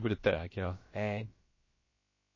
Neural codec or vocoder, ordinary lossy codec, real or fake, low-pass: codec, 16 kHz, about 1 kbps, DyCAST, with the encoder's durations; MP3, 32 kbps; fake; 7.2 kHz